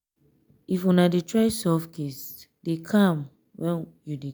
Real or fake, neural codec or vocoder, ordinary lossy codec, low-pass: real; none; none; none